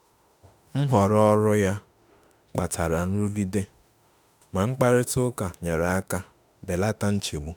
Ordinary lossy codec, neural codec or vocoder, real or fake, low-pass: none; autoencoder, 48 kHz, 32 numbers a frame, DAC-VAE, trained on Japanese speech; fake; none